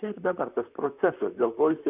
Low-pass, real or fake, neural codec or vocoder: 3.6 kHz; fake; vocoder, 44.1 kHz, 128 mel bands, Pupu-Vocoder